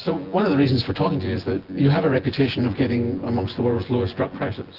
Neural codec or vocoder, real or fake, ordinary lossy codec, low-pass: vocoder, 24 kHz, 100 mel bands, Vocos; fake; Opus, 16 kbps; 5.4 kHz